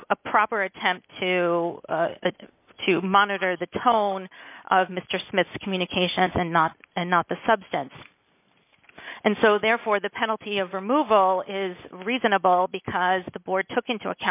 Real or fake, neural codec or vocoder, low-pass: real; none; 3.6 kHz